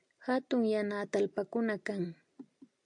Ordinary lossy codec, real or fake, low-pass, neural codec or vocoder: AAC, 64 kbps; real; 9.9 kHz; none